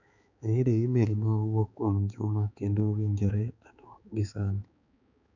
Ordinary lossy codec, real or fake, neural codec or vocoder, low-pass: none; fake; codec, 16 kHz, 4 kbps, X-Codec, HuBERT features, trained on balanced general audio; 7.2 kHz